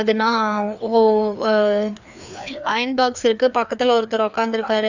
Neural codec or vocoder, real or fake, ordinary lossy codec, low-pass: codec, 16 kHz, 2 kbps, FreqCodec, larger model; fake; none; 7.2 kHz